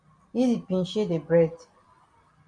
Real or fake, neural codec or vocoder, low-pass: real; none; 9.9 kHz